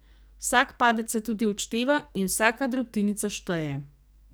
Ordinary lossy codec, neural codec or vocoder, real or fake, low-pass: none; codec, 44.1 kHz, 2.6 kbps, SNAC; fake; none